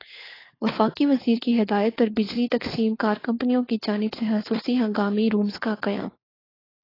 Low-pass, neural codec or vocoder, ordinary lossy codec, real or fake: 5.4 kHz; codec, 16 kHz, 4 kbps, FunCodec, trained on LibriTTS, 50 frames a second; AAC, 24 kbps; fake